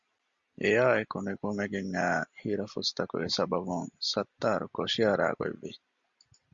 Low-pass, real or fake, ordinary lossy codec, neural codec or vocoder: 7.2 kHz; real; Opus, 64 kbps; none